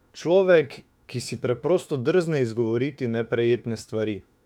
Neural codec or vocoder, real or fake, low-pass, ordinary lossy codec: autoencoder, 48 kHz, 32 numbers a frame, DAC-VAE, trained on Japanese speech; fake; 19.8 kHz; none